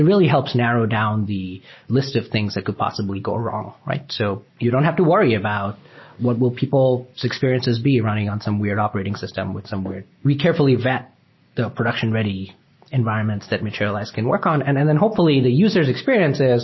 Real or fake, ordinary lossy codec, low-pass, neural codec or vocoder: real; MP3, 24 kbps; 7.2 kHz; none